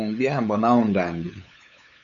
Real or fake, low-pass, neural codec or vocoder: fake; 7.2 kHz; codec, 16 kHz, 8 kbps, FunCodec, trained on LibriTTS, 25 frames a second